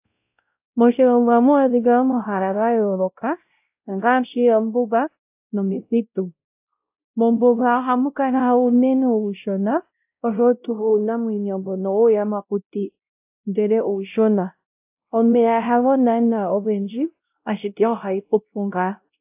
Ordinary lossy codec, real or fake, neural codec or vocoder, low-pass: AAC, 32 kbps; fake; codec, 16 kHz, 0.5 kbps, X-Codec, WavLM features, trained on Multilingual LibriSpeech; 3.6 kHz